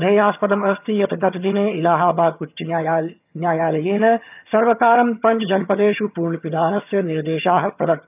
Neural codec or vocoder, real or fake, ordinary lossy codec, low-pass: vocoder, 22.05 kHz, 80 mel bands, HiFi-GAN; fake; none; 3.6 kHz